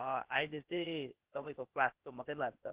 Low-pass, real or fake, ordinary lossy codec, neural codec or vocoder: 3.6 kHz; fake; Opus, 16 kbps; codec, 16 kHz, 0.8 kbps, ZipCodec